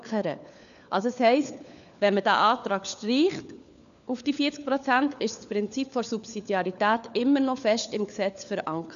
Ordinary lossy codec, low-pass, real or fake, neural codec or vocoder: none; 7.2 kHz; fake; codec, 16 kHz, 4 kbps, FunCodec, trained on LibriTTS, 50 frames a second